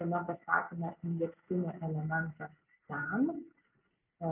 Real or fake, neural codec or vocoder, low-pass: real; none; 3.6 kHz